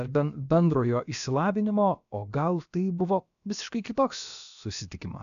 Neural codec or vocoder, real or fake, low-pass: codec, 16 kHz, about 1 kbps, DyCAST, with the encoder's durations; fake; 7.2 kHz